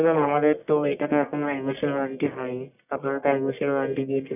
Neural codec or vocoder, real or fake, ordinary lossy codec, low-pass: codec, 44.1 kHz, 1.7 kbps, Pupu-Codec; fake; none; 3.6 kHz